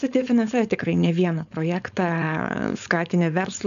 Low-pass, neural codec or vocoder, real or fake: 7.2 kHz; codec, 16 kHz, 4.8 kbps, FACodec; fake